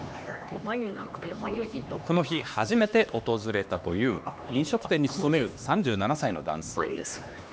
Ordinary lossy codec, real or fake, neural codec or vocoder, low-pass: none; fake; codec, 16 kHz, 2 kbps, X-Codec, HuBERT features, trained on LibriSpeech; none